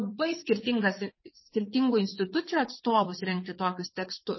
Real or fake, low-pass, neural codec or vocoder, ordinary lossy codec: fake; 7.2 kHz; vocoder, 22.05 kHz, 80 mel bands, WaveNeXt; MP3, 24 kbps